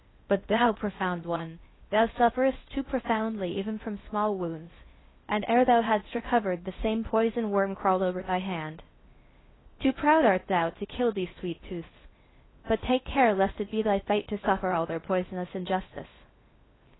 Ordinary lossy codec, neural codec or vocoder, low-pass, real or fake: AAC, 16 kbps; codec, 16 kHz in and 24 kHz out, 0.6 kbps, FocalCodec, streaming, 2048 codes; 7.2 kHz; fake